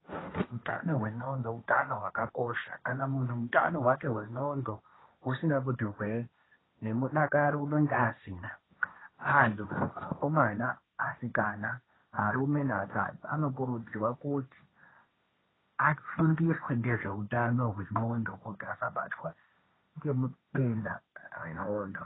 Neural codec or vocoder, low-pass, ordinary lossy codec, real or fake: codec, 16 kHz, 1.1 kbps, Voila-Tokenizer; 7.2 kHz; AAC, 16 kbps; fake